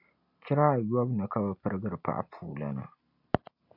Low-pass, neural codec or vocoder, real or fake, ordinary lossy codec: 5.4 kHz; none; real; MP3, 48 kbps